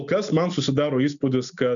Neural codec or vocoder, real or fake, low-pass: none; real; 7.2 kHz